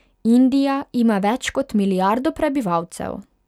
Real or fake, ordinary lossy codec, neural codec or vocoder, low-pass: real; none; none; 19.8 kHz